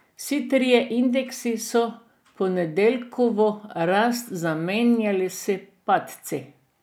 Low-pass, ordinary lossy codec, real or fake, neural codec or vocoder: none; none; real; none